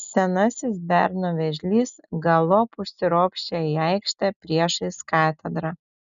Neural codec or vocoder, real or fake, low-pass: none; real; 7.2 kHz